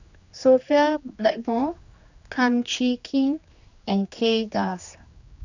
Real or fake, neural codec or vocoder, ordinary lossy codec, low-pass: fake; codec, 16 kHz, 2 kbps, X-Codec, HuBERT features, trained on general audio; none; 7.2 kHz